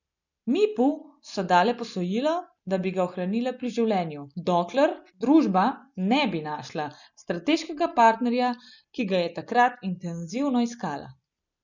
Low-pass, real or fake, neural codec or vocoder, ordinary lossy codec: 7.2 kHz; real; none; none